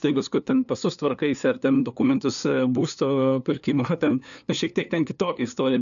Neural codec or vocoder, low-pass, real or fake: codec, 16 kHz, 2 kbps, FunCodec, trained on LibriTTS, 25 frames a second; 7.2 kHz; fake